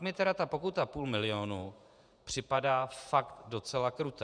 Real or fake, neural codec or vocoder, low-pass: fake; vocoder, 48 kHz, 128 mel bands, Vocos; 9.9 kHz